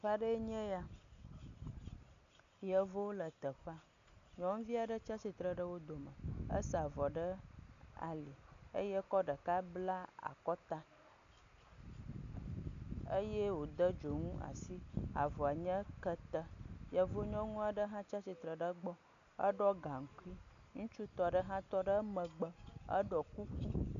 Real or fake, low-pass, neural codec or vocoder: real; 7.2 kHz; none